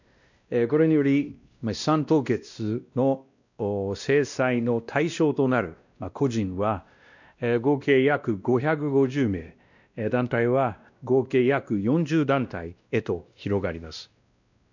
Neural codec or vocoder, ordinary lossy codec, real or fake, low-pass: codec, 16 kHz, 1 kbps, X-Codec, WavLM features, trained on Multilingual LibriSpeech; none; fake; 7.2 kHz